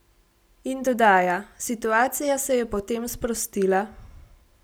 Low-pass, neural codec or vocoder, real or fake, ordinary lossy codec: none; none; real; none